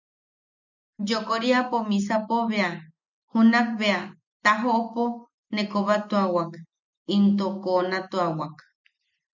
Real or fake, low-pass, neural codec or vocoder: real; 7.2 kHz; none